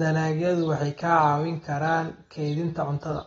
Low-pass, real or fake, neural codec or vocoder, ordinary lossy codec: 19.8 kHz; real; none; AAC, 24 kbps